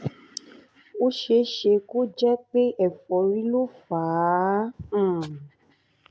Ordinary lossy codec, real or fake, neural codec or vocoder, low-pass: none; real; none; none